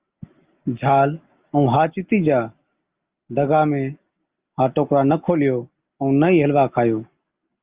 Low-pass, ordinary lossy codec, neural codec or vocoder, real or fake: 3.6 kHz; Opus, 24 kbps; none; real